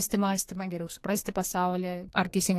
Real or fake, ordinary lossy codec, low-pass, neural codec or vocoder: fake; AAC, 64 kbps; 14.4 kHz; codec, 44.1 kHz, 2.6 kbps, SNAC